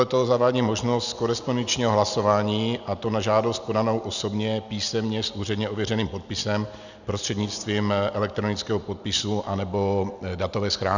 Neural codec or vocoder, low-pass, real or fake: none; 7.2 kHz; real